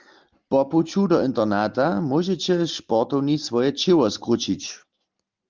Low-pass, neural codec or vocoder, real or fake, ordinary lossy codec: 7.2 kHz; none; real; Opus, 32 kbps